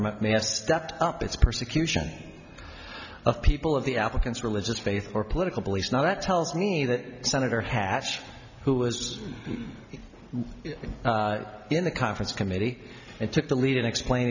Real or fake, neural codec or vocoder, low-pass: real; none; 7.2 kHz